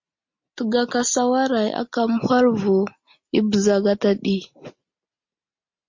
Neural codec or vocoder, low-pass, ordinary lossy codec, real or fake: none; 7.2 kHz; MP3, 48 kbps; real